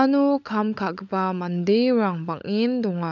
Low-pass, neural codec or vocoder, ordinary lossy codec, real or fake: 7.2 kHz; codec, 16 kHz, 16 kbps, FunCodec, trained on LibriTTS, 50 frames a second; none; fake